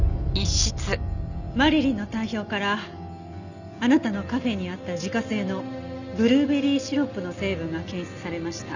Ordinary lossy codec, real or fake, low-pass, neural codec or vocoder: none; real; 7.2 kHz; none